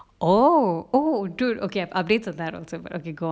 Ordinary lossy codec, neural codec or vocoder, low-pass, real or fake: none; none; none; real